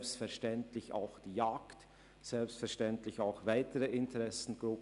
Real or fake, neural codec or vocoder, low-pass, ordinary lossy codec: real; none; 10.8 kHz; none